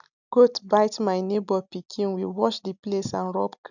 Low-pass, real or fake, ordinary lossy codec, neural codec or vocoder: 7.2 kHz; real; none; none